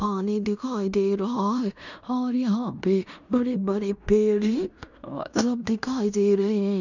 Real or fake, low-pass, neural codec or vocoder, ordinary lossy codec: fake; 7.2 kHz; codec, 16 kHz in and 24 kHz out, 0.9 kbps, LongCat-Audio-Codec, fine tuned four codebook decoder; none